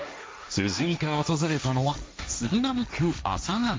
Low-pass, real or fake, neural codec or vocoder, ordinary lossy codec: none; fake; codec, 16 kHz, 1.1 kbps, Voila-Tokenizer; none